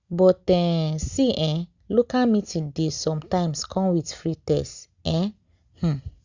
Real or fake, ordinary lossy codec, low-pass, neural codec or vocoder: real; Opus, 64 kbps; 7.2 kHz; none